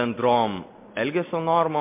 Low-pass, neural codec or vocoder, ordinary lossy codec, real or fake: 3.6 kHz; none; MP3, 24 kbps; real